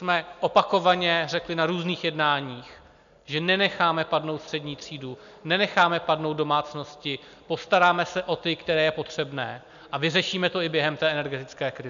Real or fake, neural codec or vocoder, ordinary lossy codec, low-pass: real; none; MP3, 96 kbps; 7.2 kHz